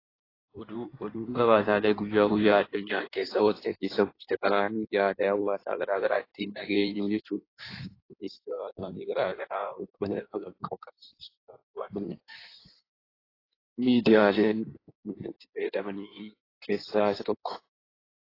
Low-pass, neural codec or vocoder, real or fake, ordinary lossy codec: 5.4 kHz; codec, 16 kHz in and 24 kHz out, 1.1 kbps, FireRedTTS-2 codec; fake; AAC, 24 kbps